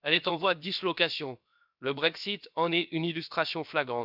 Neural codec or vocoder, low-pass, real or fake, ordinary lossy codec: codec, 16 kHz, about 1 kbps, DyCAST, with the encoder's durations; 5.4 kHz; fake; none